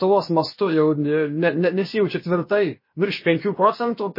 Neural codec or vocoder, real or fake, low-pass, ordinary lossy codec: codec, 16 kHz, about 1 kbps, DyCAST, with the encoder's durations; fake; 5.4 kHz; MP3, 24 kbps